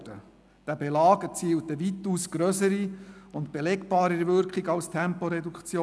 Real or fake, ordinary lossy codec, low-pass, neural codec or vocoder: real; none; none; none